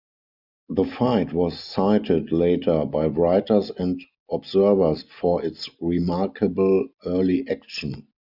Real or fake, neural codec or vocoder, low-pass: real; none; 5.4 kHz